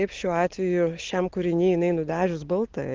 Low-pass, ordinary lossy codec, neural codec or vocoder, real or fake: 7.2 kHz; Opus, 16 kbps; none; real